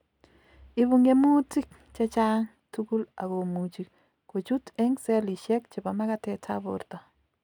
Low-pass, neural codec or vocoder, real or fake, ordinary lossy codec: 19.8 kHz; none; real; none